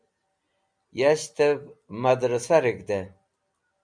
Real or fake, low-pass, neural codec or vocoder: real; 9.9 kHz; none